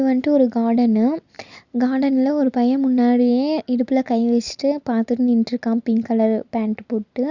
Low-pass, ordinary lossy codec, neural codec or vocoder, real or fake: 7.2 kHz; Opus, 64 kbps; none; real